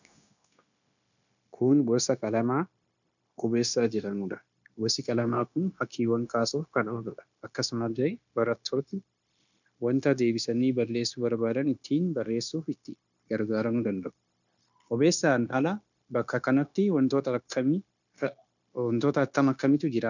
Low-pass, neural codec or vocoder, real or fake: 7.2 kHz; codec, 16 kHz, 0.9 kbps, LongCat-Audio-Codec; fake